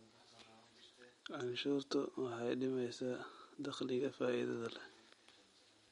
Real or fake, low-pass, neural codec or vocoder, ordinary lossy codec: real; 19.8 kHz; none; MP3, 48 kbps